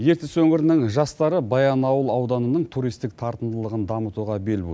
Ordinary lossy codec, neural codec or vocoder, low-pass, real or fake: none; none; none; real